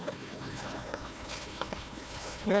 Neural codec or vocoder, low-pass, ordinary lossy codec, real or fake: codec, 16 kHz, 1 kbps, FunCodec, trained on Chinese and English, 50 frames a second; none; none; fake